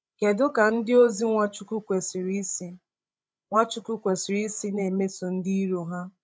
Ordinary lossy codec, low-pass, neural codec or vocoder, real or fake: none; none; codec, 16 kHz, 16 kbps, FreqCodec, larger model; fake